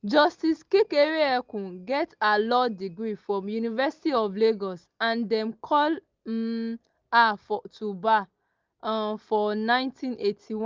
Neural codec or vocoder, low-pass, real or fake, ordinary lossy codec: none; 7.2 kHz; real; Opus, 24 kbps